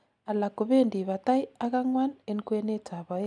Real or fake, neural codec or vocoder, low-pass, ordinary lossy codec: real; none; 9.9 kHz; none